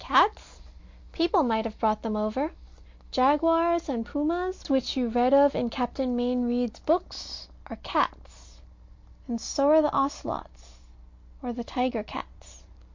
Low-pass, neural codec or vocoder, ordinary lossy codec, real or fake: 7.2 kHz; none; MP3, 48 kbps; real